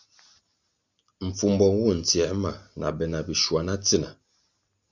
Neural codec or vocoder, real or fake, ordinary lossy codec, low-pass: none; real; Opus, 64 kbps; 7.2 kHz